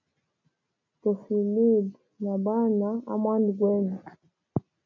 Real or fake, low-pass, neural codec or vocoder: real; 7.2 kHz; none